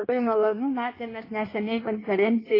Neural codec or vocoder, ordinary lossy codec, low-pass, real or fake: codec, 16 kHz in and 24 kHz out, 1.1 kbps, FireRedTTS-2 codec; AAC, 24 kbps; 5.4 kHz; fake